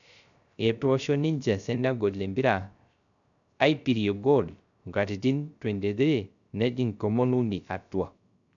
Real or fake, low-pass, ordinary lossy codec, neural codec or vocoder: fake; 7.2 kHz; none; codec, 16 kHz, 0.3 kbps, FocalCodec